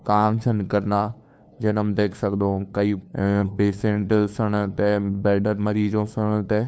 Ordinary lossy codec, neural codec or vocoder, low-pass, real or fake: none; codec, 16 kHz, 2 kbps, FunCodec, trained on LibriTTS, 25 frames a second; none; fake